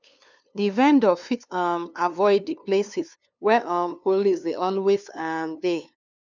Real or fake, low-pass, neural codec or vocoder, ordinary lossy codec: fake; 7.2 kHz; codec, 16 kHz, 2 kbps, FunCodec, trained on LibriTTS, 25 frames a second; none